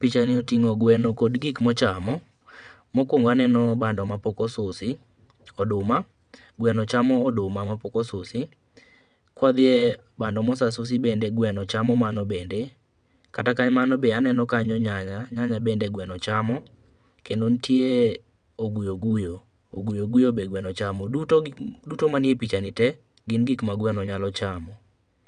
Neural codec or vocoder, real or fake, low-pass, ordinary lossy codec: vocoder, 22.05 kHz, 80 mel bands, WaveNeXt; fake; 9.9 kHz; none